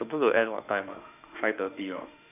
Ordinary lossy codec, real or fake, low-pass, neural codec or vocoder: none; fake; 3.6 kHz; autoencoder, 48 kHz, 32 numbers a frame, DAC-VAE, trained on Japanese speech